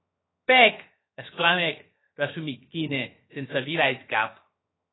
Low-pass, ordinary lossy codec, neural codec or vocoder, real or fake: 7.2 kHz; AAC, 16 kbps; codec, 16 kHz, 0.7 kbps, FocalCodec; fake